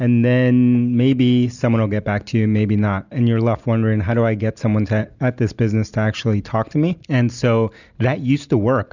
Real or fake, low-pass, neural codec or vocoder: real; 7.2 kHz; none